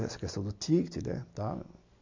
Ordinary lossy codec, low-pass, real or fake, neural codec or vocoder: MP3, 64 kbps; 7.2 kHz; real; none